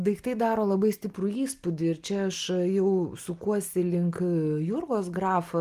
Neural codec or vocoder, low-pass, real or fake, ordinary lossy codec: none; 14.4 kHz; real; Opus, 24 kbps